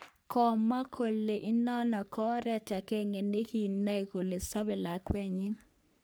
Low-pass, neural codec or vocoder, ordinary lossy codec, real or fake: none; codec, 44.1 kHz, 3.4 kbps, Pupu-Codec; none; fake